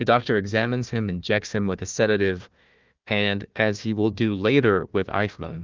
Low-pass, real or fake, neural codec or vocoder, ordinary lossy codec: 7.2 kHz; fake; codec, 16 kHz, 1 kbps, FunCodec, trained on Chinese and English, 50 frames a second; Opus, 32 kbps